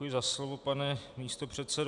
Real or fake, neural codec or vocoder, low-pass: real; none; 10.8 kHz